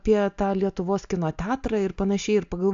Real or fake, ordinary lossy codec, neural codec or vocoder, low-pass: real; AAC, 64 kbps; none; 7.2 kHz